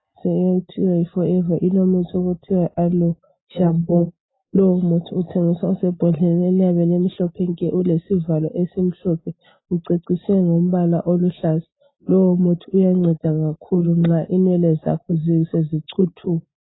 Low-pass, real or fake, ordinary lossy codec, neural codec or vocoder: 7.2 kHz; real; AAC, 16 kbps; none